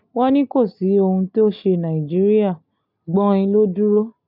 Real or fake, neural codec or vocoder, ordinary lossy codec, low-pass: real; none; none; 5.4 kHz